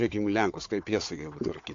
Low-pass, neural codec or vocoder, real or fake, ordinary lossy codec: 7.2 kHz; codec, 16 kHz, 4 kbps, FunCodec, trained on Chinese and English, 50 frames a second; fake; AAC, 48 kbps